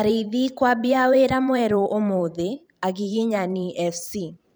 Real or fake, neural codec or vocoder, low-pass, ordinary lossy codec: fake; vocoder, 44.1 kHz, 128 mel bands every 512 samples, BigVGAN v2; none; none